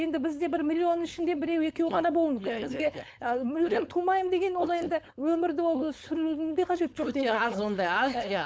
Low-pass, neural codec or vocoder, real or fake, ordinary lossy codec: none; codec, 16 kHz, 4.8 kbps, FACodec; fake; none